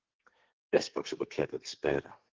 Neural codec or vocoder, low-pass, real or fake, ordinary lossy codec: codec, 32 kHz, 1.9 kbps, SNAC; 7.2 kHz; fake; Opus, 16 kbps